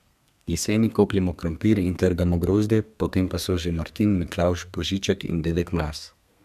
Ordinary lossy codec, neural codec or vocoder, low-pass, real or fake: none; codec, 32 kHz, 1.9 kbps, SNAC; 14.4 kHz; fake